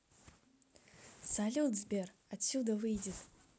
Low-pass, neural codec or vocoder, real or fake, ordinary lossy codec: none; none; real; none